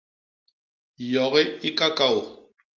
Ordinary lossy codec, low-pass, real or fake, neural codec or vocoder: Opus, 32 kbps; 7.2 kHz; real; none